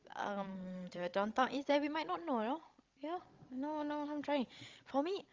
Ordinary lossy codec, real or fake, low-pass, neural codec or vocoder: Opus, 32 kbps; fake; 7.2 kHz; codec, 16 kHz, 16 kbps, FreqCodec, larger model